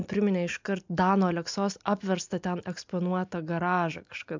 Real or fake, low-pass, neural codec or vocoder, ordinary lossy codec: real; 7.2 kHz; none; MP3, 64 kbps